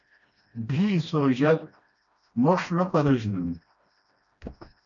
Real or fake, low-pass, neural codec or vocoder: fake; 7.2 kHz; codec, 16 kHz, 1 kbps, FreqCodec, smaller model